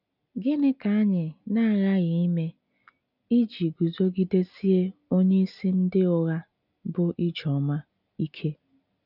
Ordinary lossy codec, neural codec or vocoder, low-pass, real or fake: none; none; 5.4 kHz; real